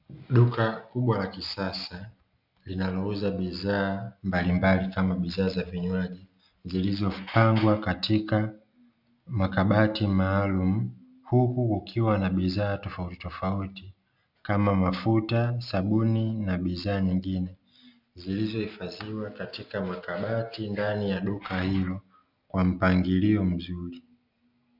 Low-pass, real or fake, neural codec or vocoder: 5.4 kHz; real; none